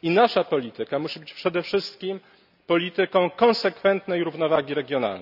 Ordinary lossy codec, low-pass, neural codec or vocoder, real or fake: none; 5.4 kHz; none; real